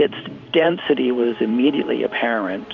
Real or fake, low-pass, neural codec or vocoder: fake; 7.2 kHz; vocoder, 44.1 kHz, 128 mel bands every 512 samples, BigVGAN v2